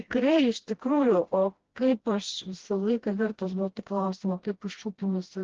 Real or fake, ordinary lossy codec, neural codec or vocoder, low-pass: fake; Opus, 16 kbps; codec, 16 kHz, 1 kbps, FreqCodec, smaller model; 7.2 kHz